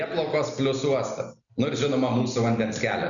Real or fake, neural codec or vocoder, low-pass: real; none; 7.2 kHz